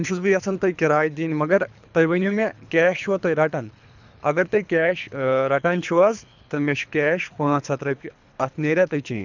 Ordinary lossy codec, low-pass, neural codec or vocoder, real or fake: none; 7.2 kHz; codec, 24 kHz, 3 kbps, HILCodec; fake